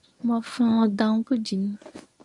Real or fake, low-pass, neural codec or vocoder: fake; 10.8 kHz; codec, 24 kHz, 0.9 kbps, WavTokenizer, medium speech release version 1